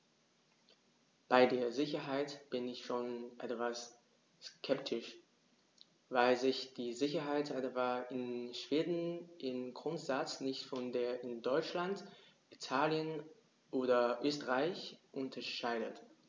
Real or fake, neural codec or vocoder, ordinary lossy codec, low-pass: real; none; none; none